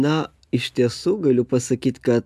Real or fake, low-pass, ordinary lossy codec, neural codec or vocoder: real; 14.4 kHz; AAC, 96 kbps; none